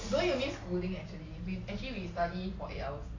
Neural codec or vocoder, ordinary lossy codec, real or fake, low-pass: none; AAC, 32 kbps; real; 7.2 kHz